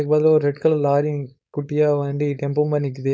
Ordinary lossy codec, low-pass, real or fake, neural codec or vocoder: none; none; fake; codec, 16 kHz, 4.8 kbps, FACodec